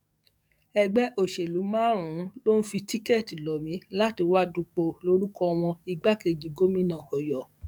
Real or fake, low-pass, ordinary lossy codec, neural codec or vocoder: fake; 19.8 kHz; none; codec, 44.1 kHz, 7.8 kbps, DAC